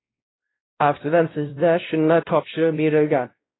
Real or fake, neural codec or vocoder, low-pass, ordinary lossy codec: fake; codec, 16 kHz, 0.5 kbps, X-Codec, WavLM features, trained on Multilingual LibriSpeech; 7.2 kHz; AAC, 16 kbps